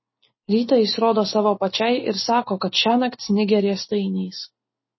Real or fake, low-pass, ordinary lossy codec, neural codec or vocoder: real; 7.2 kHz; MP3, 24 kbps; none